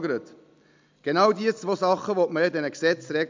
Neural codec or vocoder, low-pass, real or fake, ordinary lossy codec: none; 7.2 kHz; real; MP3, 64 kbps